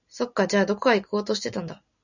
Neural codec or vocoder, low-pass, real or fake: none; 7.2 kHz; real